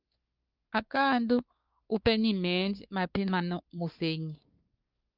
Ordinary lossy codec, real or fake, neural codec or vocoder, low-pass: Opus, 24 kbps; fake; codec, 16 kHz, 4 kbps, X-Codec, WavLM features, trained on Multilingual LibriSpeech; 5.4 kHz